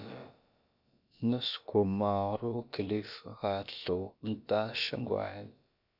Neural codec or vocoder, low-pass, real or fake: codec, 16 kHz, about 1 kbps, DyCAST, with the encoder's durations; 5.4 kHz; fake